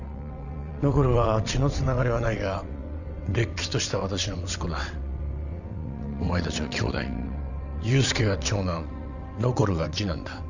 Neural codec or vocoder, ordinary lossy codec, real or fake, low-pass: vocoder, 22.05 kHz, 80 mel bands, WaveNeXt; none; fake; 7.2 kHz